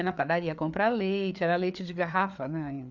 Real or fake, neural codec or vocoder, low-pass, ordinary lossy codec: fake; codec, 16 kHz, 4 kbps, FreqCodec, larger model; 7.2 kHz; none